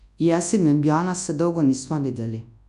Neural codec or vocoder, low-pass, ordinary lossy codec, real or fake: codec, 24 kHz, 0.9 kbps, WavTokenizer, large speech release; 10.8 kHz; none; fake